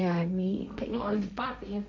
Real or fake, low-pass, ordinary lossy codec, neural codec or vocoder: fake; none; none; codec, 16 kHz, 1.1 kbps, Voila-Tokenizer